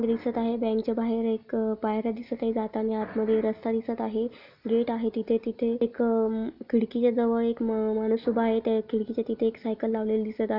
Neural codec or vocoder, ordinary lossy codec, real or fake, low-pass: none; none; real; 5.4 kHz